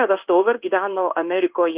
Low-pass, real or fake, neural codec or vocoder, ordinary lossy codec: 3.6 kHz; fake; codec, 16 kHz in and 24 kHz out, 1 kbps, XY-Tokenizer; Opus, 24 kbps